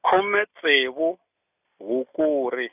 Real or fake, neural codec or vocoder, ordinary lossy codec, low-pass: real; none; none; 3.6 kHz